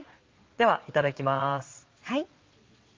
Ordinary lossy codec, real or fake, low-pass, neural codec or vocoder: Opus, 32 kbps; fake; 7.2 kHz; vocoder, 22.05 kHz, 80 mel bands, Vocos